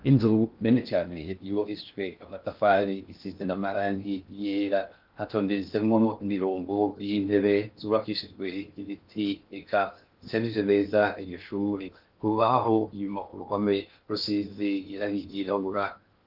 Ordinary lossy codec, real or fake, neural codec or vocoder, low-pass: Opus, 24 kbps; fake; codec, 16 kHz in and 24 kHz out, 0.6 kbps, FocalCodec, streaming, 2048 codes; 5.4 kHz